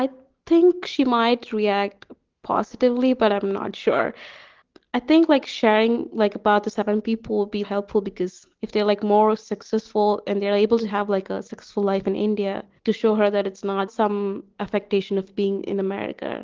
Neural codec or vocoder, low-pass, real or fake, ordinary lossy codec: none; 7.2 kHz; real; Opus, 16 kbps